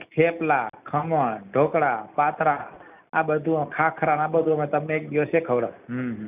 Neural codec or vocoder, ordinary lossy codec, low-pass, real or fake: none; none; 3.6 kHz; real